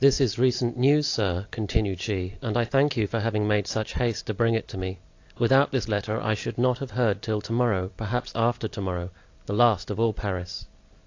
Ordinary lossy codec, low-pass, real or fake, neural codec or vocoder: AAC, 48 kbps; 7.2 kHz; real; none